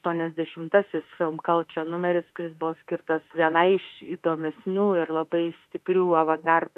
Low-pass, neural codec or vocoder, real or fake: 14.4 kHz; autoencoder, 48 kHz, 32 numbers a frame, DAC-VAE, trained on Japanese speech; fake